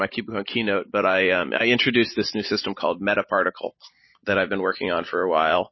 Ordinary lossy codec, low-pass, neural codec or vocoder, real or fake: MP3, 24 kbps; 7.2 kHz; none; real